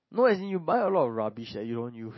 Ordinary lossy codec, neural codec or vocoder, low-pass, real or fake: MP3, 24 kbps; none; 7.2 kHz; real